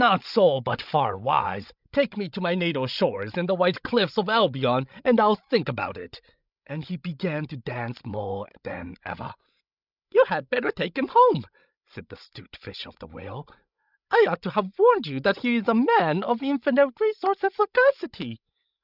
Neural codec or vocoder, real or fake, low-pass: codec, 16 kHz, 16 kbps, FreqCodec, larger model; fake; 5.4 kHz